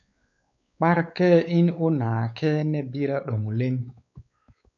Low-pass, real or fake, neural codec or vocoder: 7.2 kHz; fake; codec, 16 kHz, 4 kbps, X-Codec, WavLM features, trained on Multilingual LibriSpeech